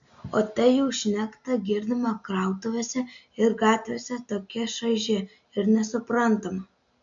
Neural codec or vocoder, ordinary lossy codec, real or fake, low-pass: none; MP3, 64 kbps; real; 7.2 kHz